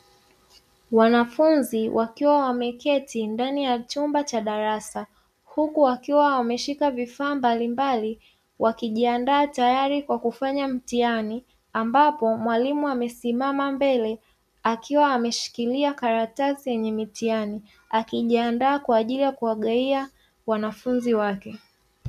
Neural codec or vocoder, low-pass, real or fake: none; 14.4 kHz; real